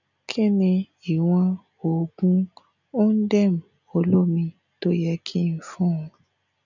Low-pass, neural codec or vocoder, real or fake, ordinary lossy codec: 7.2 kHz; none; real; none